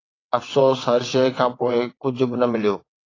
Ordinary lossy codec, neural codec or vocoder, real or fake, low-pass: AAC, 32 kbps; vocoder, 22.05 kHz, 80 mel bands, WaveNeXt; fake; 7.2 kHz